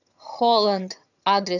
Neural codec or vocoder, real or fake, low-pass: vocoder, 22.05 kHz, 80 mel bands, HiFi-GAN; fake; 7.2 kHz